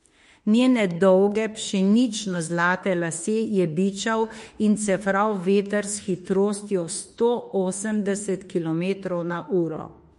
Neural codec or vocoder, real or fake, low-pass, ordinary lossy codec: autoencoder, 48 kHz, 32 numbers a frame, DAC-VAE, trained on Japanese speech; fake; 14.4 kHz; MP3, 48 kbps